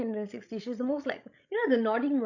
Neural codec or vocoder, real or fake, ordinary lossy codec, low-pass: codec, 16 kHz, 16 kbps, FreqCodec, larger model; fake; none; 7.2 kHz